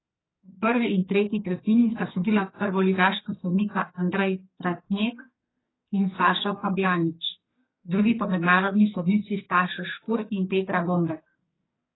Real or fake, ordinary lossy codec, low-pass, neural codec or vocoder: fake; AAC, 16 kbps; 7.2 kHz; codec, 44.1 kHz, 2.6 kbps, SNAC